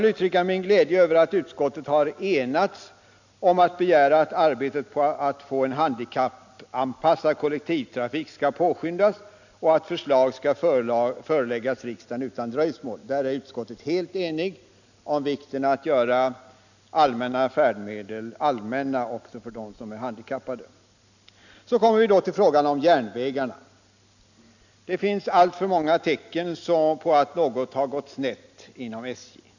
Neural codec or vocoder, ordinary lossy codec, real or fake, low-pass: none; none; real; 7.2 kHz